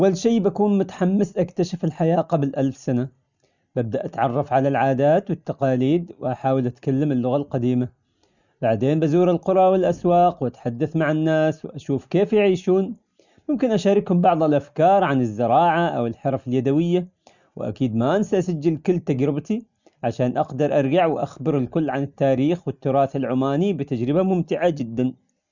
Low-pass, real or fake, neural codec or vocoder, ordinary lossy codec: 7.2 kHz; real; none; none